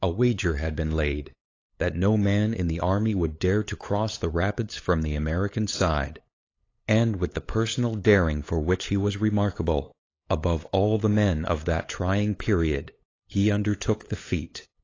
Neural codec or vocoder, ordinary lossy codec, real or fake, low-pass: codec, 16 kHz, 8 kbps, FunCodec, trained on LibriTTS, 25 frames a second; AAC, 32 kbps; fake; 7.2 kHz